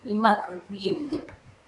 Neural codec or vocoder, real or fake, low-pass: codec, 24 kHz, 1 kbps, SNAC; fake; 10.8 kHz